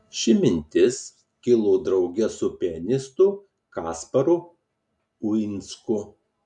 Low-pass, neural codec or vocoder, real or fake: 10.8 kHz; none; real